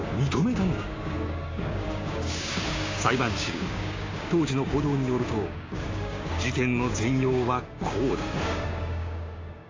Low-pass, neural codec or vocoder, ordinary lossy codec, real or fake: 7.2 kHz; codec, 16 kHz, 6 kbps, DAC; AAC, 32 kbps; fake